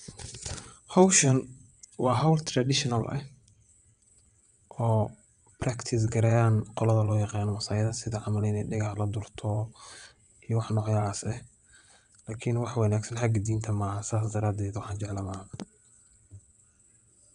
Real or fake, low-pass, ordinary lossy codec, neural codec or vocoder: fake; 9.9 kHz; none; vocoder, 22.05 kHz, 80 mel bands, WaveNeXt